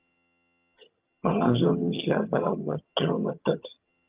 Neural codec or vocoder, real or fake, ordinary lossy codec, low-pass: vocoder, 22.05 kHz, 80 mel bands, HiFi-GAN; fake; Opus, 32 kbps; 3.6 kHz